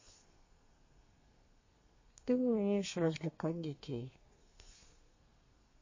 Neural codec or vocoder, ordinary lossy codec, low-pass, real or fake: codec, 32 kHz, 1.9 kbps, SNAC; MP3, 32 kbps; 7.2 kHz; fake